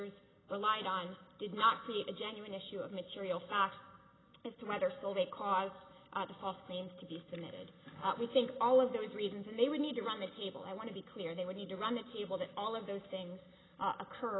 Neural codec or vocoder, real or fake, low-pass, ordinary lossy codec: none; real; 7.2 kHz; AAC, 16 kbps